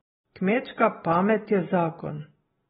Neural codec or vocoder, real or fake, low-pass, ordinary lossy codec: none; real; 7.2 kHz; AAC, 16 kbps